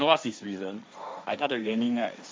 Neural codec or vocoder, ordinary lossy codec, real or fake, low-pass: codec, 16 kHz, 1.1 kbps, Voila-Tokenizer; none; fake; none